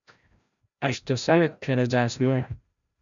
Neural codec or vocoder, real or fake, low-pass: codec, 16 kHz, 0.5 kbps, FreqCodec, larger model; fake; 7.2 kHz